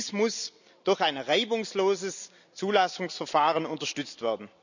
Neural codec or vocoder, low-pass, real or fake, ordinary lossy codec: none; 7.2 kHz; real; none